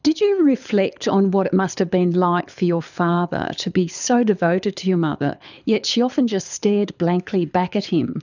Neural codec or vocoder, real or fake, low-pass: codec, 24 kHz, 6 kbps, HILCodec; fake; 7.2 kHz